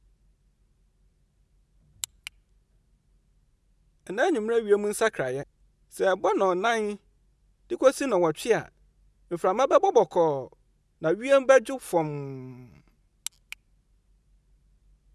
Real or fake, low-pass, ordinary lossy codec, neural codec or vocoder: real; none; none; none